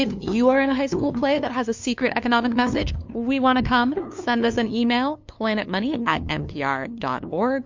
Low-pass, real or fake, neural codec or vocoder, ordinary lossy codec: 7.2 kHz; fake; codec, 16 kHz, 2 kbps, FunCodec, trained on LibriTTS, 25 frames a second; MP3, 48 kbps